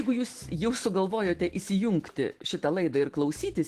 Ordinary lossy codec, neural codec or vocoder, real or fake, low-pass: Opus, 16 kbps; autoencoder, 48 kHz, 128 numbers a frame, DAC-VAE, trained on Japanese speech; fake; 14.4 kHz